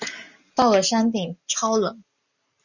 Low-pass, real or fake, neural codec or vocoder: 7.2 kHz; real; none